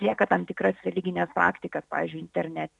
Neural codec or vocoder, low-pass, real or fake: none; 10.8 kHz; real